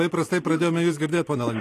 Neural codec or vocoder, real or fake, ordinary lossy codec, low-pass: none; real; AAC, 48 kbps; 14.4 kHz